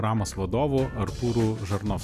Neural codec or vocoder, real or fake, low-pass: none; real; 14.4 kHz